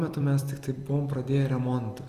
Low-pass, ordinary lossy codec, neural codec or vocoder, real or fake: 14.4 kHz; Opus, 32 kbps; vocoder, 44.1 kHz, 128 mel bands every 256 samples, BigVGAN v2; fake